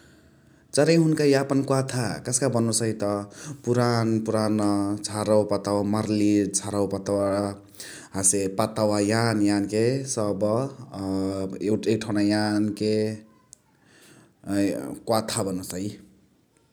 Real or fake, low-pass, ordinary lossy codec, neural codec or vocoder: real; none; none; none